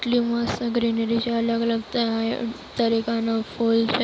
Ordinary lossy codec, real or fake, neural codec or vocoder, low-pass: none; real; none; none